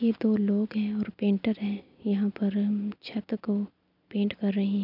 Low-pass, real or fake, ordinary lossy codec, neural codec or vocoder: 5.4 kHz; real; none; none